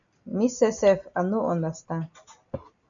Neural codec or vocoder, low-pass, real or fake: none; 7.2 kHz; real